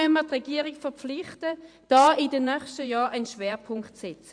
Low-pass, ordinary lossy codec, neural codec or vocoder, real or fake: 9.9 kHz; MP3, 64 kbps; vocoder, 44.1 kHz, 128 mel bands every 512 samples, BigVGAN v2; fake